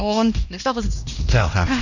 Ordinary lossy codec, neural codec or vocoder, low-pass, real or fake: none; codec, 16 kHz, 1 kbps, X-Codec, HuBERT features, trained on LibriSpeech; 7.2 kHz; fake